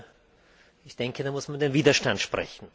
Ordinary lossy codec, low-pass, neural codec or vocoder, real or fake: none; none; none; real